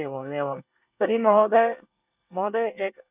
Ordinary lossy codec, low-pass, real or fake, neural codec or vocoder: none; 3.6 kHz; fake; codec, 24 kHz, 1 kbps, SNAC